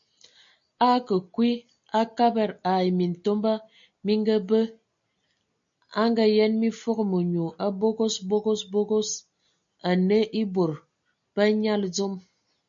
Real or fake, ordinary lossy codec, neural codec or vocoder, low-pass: real; MP3, 64 kbps; none; 7.2 kHz